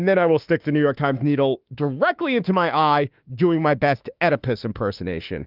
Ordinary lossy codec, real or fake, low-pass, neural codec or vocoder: Opus, 32 kbps; fake; 5.4 kHz; autoencoder, 48 kHz, 32 numbers a frame, DAC-VAE, trained on Japanese speech